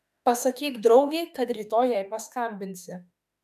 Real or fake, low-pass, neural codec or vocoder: fake; 14.4 kHz; autoencoder, 48 kHz, 32 numbers a frame, DAC-VAE, trained on Japanese speech